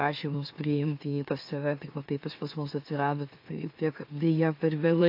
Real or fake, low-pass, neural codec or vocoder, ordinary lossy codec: fake; 5.4 kHz; autoencoder, 44.1 kHz, a latent of 192 numbers a frame, MeloTTS; AAC, 32 kbps